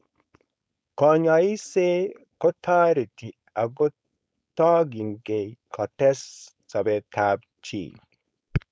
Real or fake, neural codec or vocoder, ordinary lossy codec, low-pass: fake; codec, 16 kHz, 4.8 kbps, FACodec; none; none